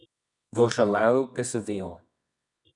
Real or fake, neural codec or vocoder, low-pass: fake; codec, 24 kHz, 0.9 kbps, WavTokenizer, medium music audio release; 10.8 kHz